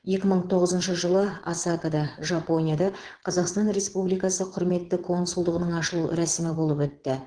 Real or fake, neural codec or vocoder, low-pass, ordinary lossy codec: fake; vocoder, 22.05 kHz, 80 mel bands, WaveNeXt; 9.9 kHz; Opus, 16 kbps